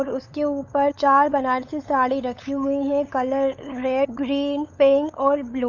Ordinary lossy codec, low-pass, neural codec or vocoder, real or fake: Opus, 64 kbps; 7.2 kHz; codec, 16 kHz, 8 kbps, FunCodec, trained on LibriTTS, 25 frames a second; fake